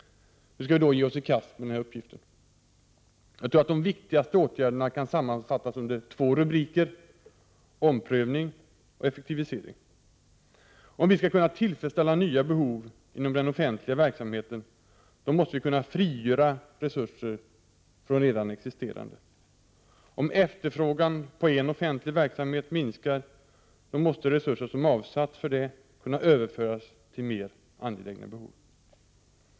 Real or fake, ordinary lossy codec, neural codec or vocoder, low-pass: real; none; none; none